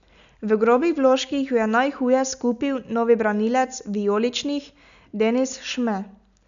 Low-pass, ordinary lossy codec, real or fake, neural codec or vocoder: 7.2 kHz; none; real; none